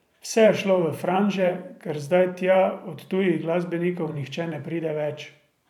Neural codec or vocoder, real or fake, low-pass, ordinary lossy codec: vocoder, 44.1 kHz, 128 mel bands every 512 samples, BigVGAN v2; fake; 19.8 kHz; none